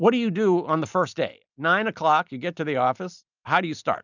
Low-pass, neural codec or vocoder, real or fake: 7.2 kHz; none; real